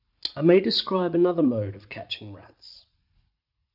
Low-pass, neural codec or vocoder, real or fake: 5.4 kHz; vocoder, 44.1 kHz, 80 mel bands, Vocos; fake